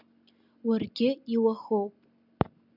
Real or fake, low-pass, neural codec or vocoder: real; 5.4 kHz; none